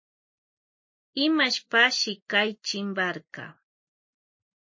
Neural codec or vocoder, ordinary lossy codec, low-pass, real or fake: none; MP3, 32 kbps; 7.2 kHz; real